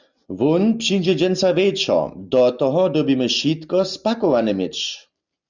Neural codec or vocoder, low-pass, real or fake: none; 7.2 kHz; real